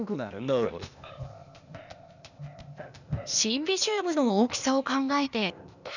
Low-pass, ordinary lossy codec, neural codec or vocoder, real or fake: 7.2 kHz; none; codec, 16 kHz, 0.8 kbps, ZipCodec; fake